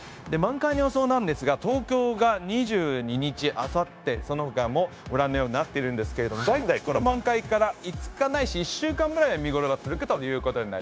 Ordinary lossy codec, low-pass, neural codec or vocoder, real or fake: none; none; codec, 16 kHz, 0.9 kbps, LongCat-Audio-Codec; fake